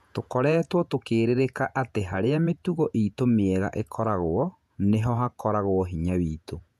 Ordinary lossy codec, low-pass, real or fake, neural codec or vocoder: none; 14.4 kHz; fake; vocoder, 48 kHz, 128 mel bands, Vocos